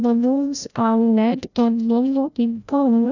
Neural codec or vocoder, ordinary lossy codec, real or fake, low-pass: codec, 16 kHz, 0.5 kbps, FreqCodec, larger model; none; fake; 7.2 kHz